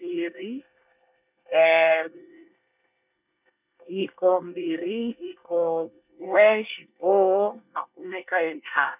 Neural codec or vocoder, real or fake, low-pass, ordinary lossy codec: codec, 24 kHz, 1 kbps, SNAC; fake; 3.6 kHz; none